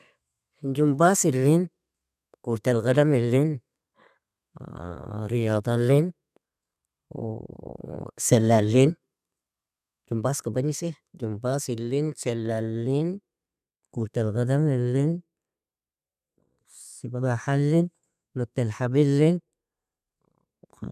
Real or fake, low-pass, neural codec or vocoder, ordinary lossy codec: fake; 14.4 kHz; codec, 32 kHz, 1.9 kbps, SNAC; none